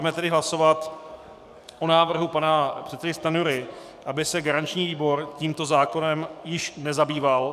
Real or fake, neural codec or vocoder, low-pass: fake; codec, 44.1 kHz, 7.8 kbps, DAC; 14.4 kHz